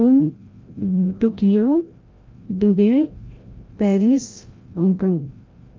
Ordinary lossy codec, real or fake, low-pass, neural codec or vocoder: Opus, 32 kbps; fake; 7.2 kHz; codec, 16 kHz, 0.5 kbps, FreqCodec, larger model